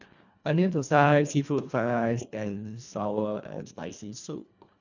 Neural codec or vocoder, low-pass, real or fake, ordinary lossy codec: codec, 24 kHz, 1.5 kbps, HILCodec; 7.2 kHz; fake; none